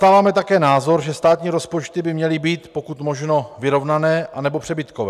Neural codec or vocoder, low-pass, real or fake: none; 14.4 kHz; real